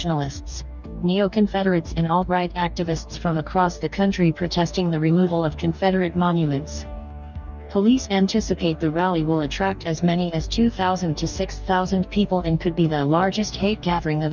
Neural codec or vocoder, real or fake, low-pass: codec, 44.1 kHz, 2.6 kbps, DAC; fake; 7.2 kHz